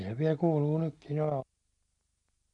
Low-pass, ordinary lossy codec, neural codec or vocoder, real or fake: 10.8 kHz; MP3, 48 kbps; none; real